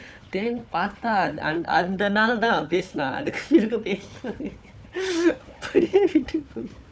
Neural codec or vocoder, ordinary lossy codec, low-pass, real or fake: codec, 16 kHz, 4 kbps, FunCodec, trained on Chinese and English, 50 frames a second; none; none; fake